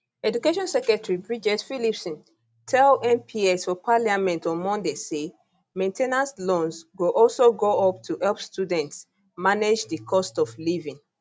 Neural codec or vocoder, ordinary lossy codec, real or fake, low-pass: none; none; real; none